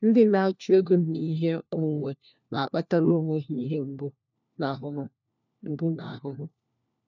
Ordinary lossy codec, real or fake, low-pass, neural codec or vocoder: none; fake; 7.2 kHz; codec, 16 kHz, 1 kbps, FunCodec, trained on LibriTTS, 50 frames a second